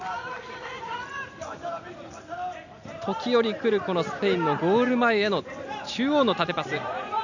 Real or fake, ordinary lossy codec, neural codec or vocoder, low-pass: real; none; none; 7.2 kHz